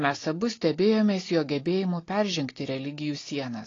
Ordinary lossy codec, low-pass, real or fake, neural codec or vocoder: AAC, 32 kbps; 7.2 kHz; real; none